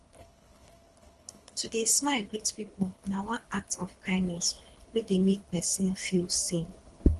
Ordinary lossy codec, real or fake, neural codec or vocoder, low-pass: Opus, 24 kbps; fake; codec, 24 kHz, 3 kbps, HILCodec; 10.8 kHz